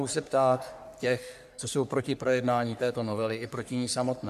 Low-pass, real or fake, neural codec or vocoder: 14.4 kHz; fake; codec, 44.1 kHz, 3.4 kbps, Pupu-Codec